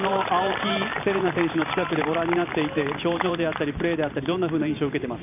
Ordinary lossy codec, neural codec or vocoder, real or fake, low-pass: none; vocoder, 44.1 kHz, 128 mel bands every 512 samples, BigVGAN v2; fake; 3.6 kHz